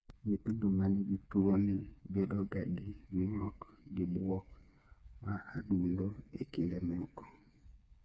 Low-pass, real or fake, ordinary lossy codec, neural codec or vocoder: none; fake; none; codec, 16 kHz, 4 kbps, FreqCodec, smaller model